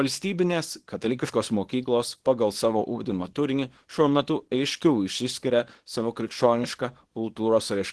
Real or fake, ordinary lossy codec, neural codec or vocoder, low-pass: fake; Opus, 16 kbps; codec, 24 kHz, 0.9 kbps, WavTokenizer, small release; 10.8 kHz